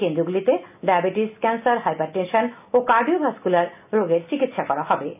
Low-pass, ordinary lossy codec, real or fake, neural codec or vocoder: 3.6 kHz; none; real; none